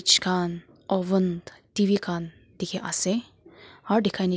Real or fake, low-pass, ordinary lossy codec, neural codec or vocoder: real; none; none; none